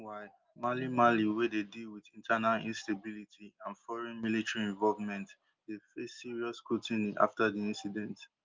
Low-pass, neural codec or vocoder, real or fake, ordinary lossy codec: 7.2 kHz; none; real; Opus, 24 kbps